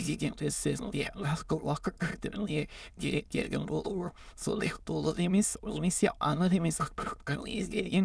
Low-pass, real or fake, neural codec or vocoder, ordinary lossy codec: none; fake; autoencoder, 22.05 kHz, a latent of 192 numbers a frame, VITS, trained on many speakers; none